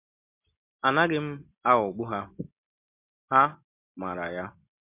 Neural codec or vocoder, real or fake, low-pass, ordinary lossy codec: none; real; 3.6 kHz; MP3, 32 kbps